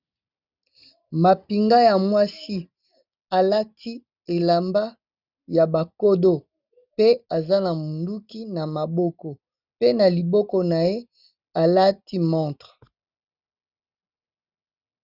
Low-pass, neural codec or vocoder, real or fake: 5.4 kHz; none; real